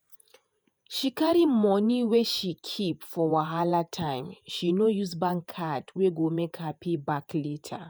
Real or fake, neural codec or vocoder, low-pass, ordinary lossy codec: fake; vocoder, 48 kHz, 128 mel bands, Vocos; none; none